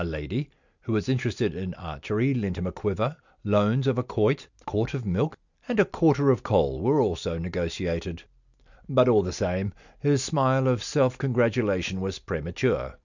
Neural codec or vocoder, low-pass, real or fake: none; 7.2 kHz; real